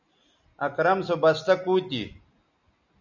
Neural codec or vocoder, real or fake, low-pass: none; real; 7.2 kHz